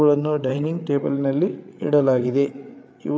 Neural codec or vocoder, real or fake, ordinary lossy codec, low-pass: codec, 16 kHz, 8 kbps, FreqCodec, larger model; fake; none; none